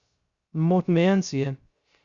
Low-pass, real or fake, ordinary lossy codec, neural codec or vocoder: 7.2 kHz; fake; Opus, 64 kbps; codec, 16 kHz, 0.3 kbps, FocalCodec